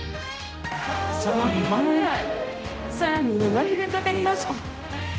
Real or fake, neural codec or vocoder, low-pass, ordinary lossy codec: fake; codec, 16 kHz, 0.5 kbps, X-Codec, HuBERT features, trained on general audio; none; none